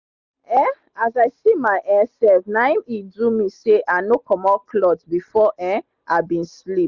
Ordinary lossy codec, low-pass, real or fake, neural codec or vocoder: Opus, 64 kbps; 7.2 kHz; real; none